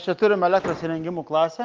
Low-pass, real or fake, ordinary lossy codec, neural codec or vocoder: 7.2 kHz; real; Opus, 32 kbps; none